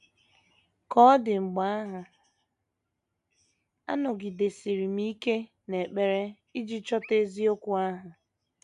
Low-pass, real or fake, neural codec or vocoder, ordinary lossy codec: 10.8 kHz; real; none; AAC, 96 kbps